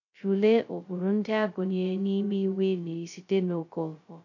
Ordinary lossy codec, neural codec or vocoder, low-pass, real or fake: none; codec, 16 kHz, 0.2 kbps, FocalCodec; 7.2 kHz; fake